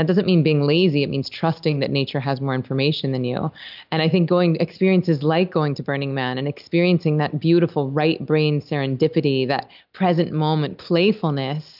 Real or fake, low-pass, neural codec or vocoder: real; 5.4 kHz; none